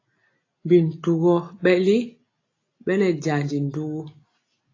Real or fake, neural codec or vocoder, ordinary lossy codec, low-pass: real; none; AAC, 32 kbps; 7.2 kHz